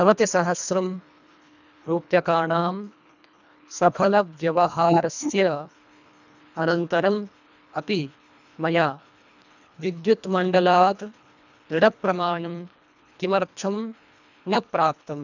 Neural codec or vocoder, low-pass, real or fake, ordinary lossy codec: codec, 24 kHz, 1.5 kbps, HILCodec; 7.2 kHz; fake; none